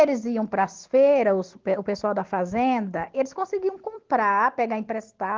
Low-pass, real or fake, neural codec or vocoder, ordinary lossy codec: 7.2 kHz; real; none; Opus, 16 kbps